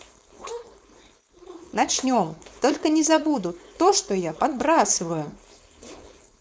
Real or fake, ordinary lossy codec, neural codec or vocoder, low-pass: fake; none; codec, 16 kHz, 4.8 kbps, FACodec; none